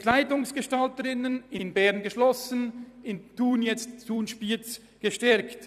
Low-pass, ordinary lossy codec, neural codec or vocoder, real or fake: 14.4 kHz; none; vocoder, 44.1 kHz, 128 mel bands every 256 samples, BigVGAN v2; fake